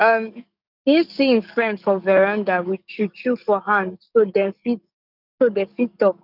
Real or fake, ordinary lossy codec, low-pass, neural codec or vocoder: fake; none; 5.4 kHz; codec, 44.1 kHz, 7.8 kbps, DAC